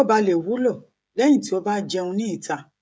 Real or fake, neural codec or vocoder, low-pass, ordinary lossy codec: fake; codec, 16 kHz, 16 kbps, FreqCodec, smaller model; none; none